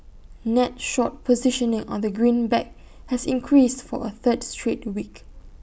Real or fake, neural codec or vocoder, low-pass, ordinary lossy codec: real; none; none; none